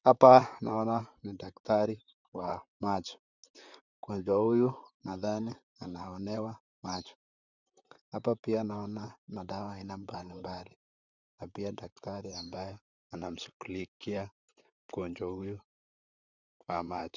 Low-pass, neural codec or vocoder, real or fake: 7.2 kHz; vocoder, 44.1 kHz, 128 mel bands, Pupu-Vocoder; fake